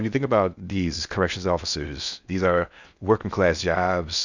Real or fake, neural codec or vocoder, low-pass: fake; codec, 16 kHz in and 24 kHz out, 0.6 kbps, FocalCodec, streaming, 2048 codes; 7.2 kHz